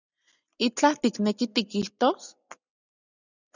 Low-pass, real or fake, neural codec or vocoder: 7.2 kHz; real; none